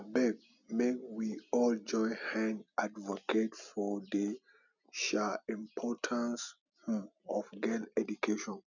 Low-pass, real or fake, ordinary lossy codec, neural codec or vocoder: 7.2 kHz; real; none; none